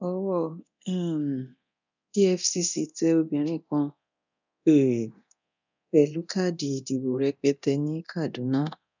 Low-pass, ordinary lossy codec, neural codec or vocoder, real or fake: 7.2 kHz; none; codec, 24 kHz, 0.9 kbps, DualCodec; fake